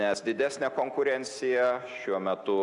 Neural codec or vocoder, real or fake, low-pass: none; real; 10.8 kHz